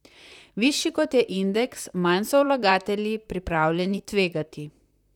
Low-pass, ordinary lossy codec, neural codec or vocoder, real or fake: 19.8 kHz; none; vocoder, 44.1 kHz, 128 mel bands, Pupu-Vocoder; fake